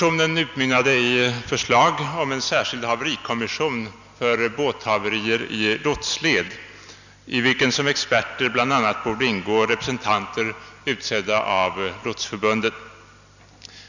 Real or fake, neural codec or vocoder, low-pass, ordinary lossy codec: real; none; 7.2 kHz; none